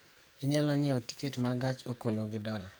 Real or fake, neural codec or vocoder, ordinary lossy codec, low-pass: fake; codec, 44.1 kHz, 2.6 kbps, SNAC; none; none